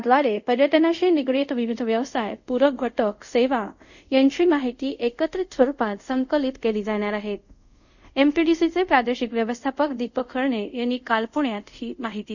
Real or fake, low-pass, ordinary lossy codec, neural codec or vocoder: fake; 7.2 kHz; none; codec, 24 kHz, 0.5 kbps, DualCodec